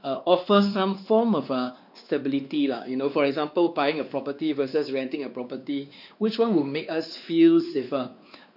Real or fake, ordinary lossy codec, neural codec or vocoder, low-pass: fake; none; codec, 16 kHz, 2 kbps, X-Codec, WavLM features, trained on Multilingual LibriSpeech; 5.4 kHz